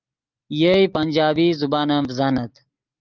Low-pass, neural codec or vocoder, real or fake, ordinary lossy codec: 7.2 kHz; none; real; Opus, 32 kbps